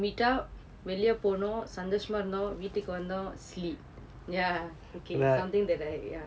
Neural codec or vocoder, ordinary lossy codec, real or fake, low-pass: none; none; real; none